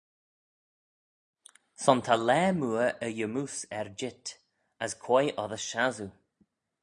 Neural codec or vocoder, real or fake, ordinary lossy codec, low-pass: none; real; MP3, 96 kbps; 10.8 kHz